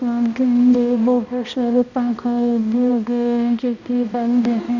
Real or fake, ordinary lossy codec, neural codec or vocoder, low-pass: fake; none; codec, 16 kHz, 1 kbps, X-Codec, HuBERT features, trained on balanced general audio; 7.2 kHz